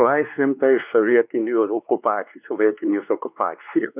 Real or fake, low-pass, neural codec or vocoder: fake; 3.6 kHz; codec, 16 kHz, 2 kbps, X-Codec, WavLM features, trained on Multilingual LibriSpeech